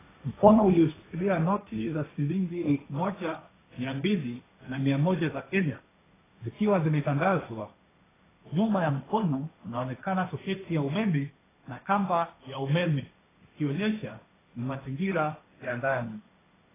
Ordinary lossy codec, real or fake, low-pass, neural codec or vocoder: AAC, 16 kbps; fake; 3.6 kHz; codec, 16 kHz, 1.1 kbps, Voila-Tokenizer